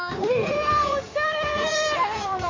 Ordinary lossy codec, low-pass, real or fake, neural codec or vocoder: MP3, 48 kbps; 7.2 kHz; fake; codec, 16 kHz in and 24 kHz out, 2.2 kbps, FireRedTTS-2 codec